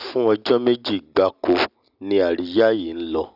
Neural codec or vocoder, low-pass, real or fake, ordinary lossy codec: none; 5.4 kHz; real; none